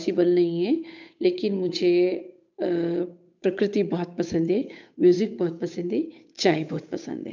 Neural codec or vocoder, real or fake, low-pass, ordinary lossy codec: codec, 16 kHz, 8 kbps, FunCodec, trained on Chinese and English, 25 frames a second; fake; 7.2 kHz; none